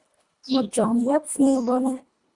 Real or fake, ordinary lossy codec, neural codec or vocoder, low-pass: fake; Opus, 64 kbps; codec, 24 kHz, 1.5 kbps, HILCodec; 10.8 kHz